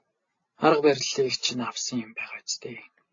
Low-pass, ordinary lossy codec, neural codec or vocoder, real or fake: 7.2 kHz; AAC, 32 kbps; none; real